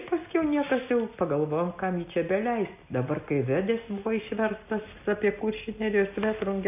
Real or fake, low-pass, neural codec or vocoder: real; 3.6 kHz; none